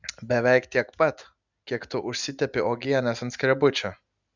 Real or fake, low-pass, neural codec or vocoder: real; 7.2 kHz; none